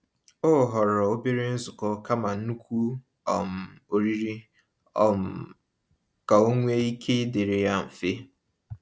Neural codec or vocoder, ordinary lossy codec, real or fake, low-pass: none; none; real; none